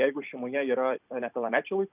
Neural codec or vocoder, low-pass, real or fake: vocoder, 44.1 kHz, 128 mel bands every 256 samples, BigVGAN v2; 3.6 kHz; fake